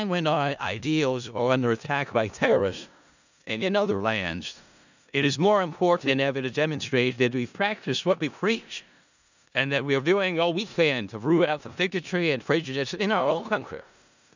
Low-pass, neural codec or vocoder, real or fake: 7.2 kHz; codec, 16 kHz in and 24 kHz out, 0.4 kbps, LongCat-Audio-Codec, four codebook decoder; fake